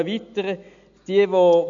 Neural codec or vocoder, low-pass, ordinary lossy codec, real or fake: none; 7.2 kHz; none; real